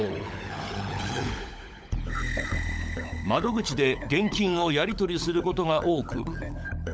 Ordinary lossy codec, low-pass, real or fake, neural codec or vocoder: none; none; fake; codec, 16 kHz, 16 kbps, FunCodec, trained on LibriTTS, 50 frames a second